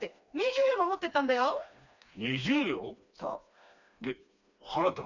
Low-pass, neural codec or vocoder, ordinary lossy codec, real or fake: 7.2 kHz; codec, 16 kHz, 2 kbps, FreqCodec, smaller model; none; fake